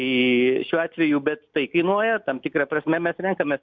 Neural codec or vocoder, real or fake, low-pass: none; real; 7.2 kHz